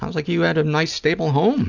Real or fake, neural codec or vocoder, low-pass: real; none; 7.2 kHz